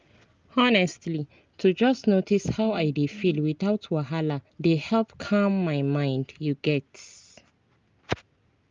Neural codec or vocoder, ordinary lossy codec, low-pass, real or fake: none; Opus, 32 kbps; 7.2 kHz; real